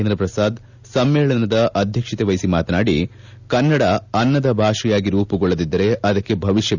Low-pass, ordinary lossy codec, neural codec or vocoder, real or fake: 7.2 kHz; none; none; real